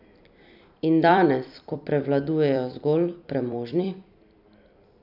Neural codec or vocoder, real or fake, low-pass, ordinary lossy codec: vocoder, 44.1 kHz, 128 mel bands every 256 samples, BigVGAN v2; fake; 5.4 kHz; none